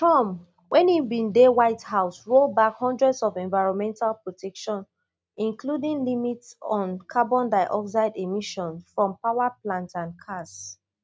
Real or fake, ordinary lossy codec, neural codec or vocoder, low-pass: real; none; none; none